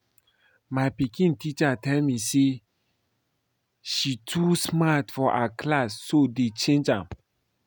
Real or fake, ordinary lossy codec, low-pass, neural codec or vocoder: real; none; none; none